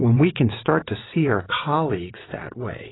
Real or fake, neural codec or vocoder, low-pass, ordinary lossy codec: fake; vocoder, 22.05 kHz, 80 mel bands, WaveNeXt; 7.2 kHz; AAC, 16 kbps